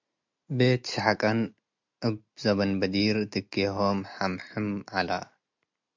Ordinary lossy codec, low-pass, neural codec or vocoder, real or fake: MP3, 64 kbps; 7.2 kHz; none; real